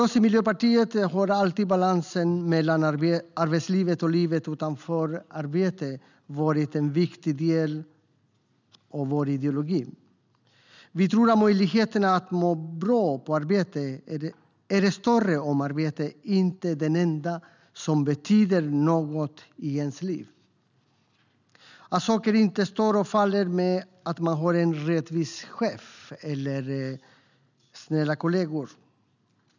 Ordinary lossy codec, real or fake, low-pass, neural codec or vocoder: none; real; 7.2 kHz; none